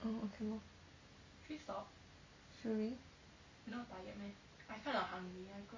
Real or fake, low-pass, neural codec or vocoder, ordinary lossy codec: real; 7.2 kHz; none; MP3, 32 kbps